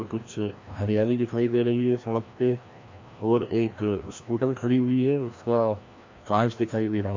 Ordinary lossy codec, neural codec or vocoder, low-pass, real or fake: MP3, 48 kbps; codec, 16 kHz, 1 kbps, FreqCodec, larger model; 7.2 kHz; fake